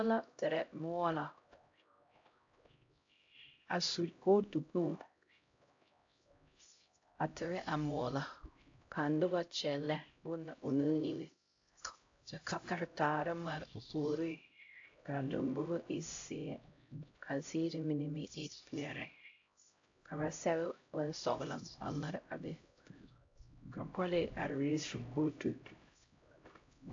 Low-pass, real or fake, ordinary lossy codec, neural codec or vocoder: 7.2 kHz; fake; AAC, 48 kbps; codec, 16 kHz, 0.5 kbps, X-Codec, HuBERT features, trained on LibriSpeech